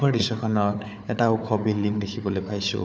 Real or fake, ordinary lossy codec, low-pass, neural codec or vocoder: fake; none; none; codec, 16 kHz, 4 kbps, FunCodec, trained on Chinese and English, 50 frames a second